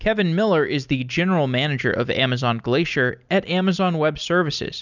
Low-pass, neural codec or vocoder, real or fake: 7.2 kHz; none; real